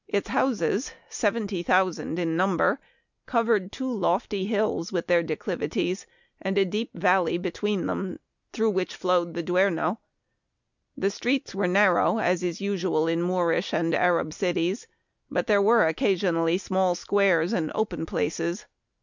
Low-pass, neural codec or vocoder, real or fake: 7.2 kHz; none; real